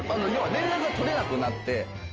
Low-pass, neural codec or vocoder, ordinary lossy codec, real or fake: 7.2 kHz; none; Opus, 24 kbps; real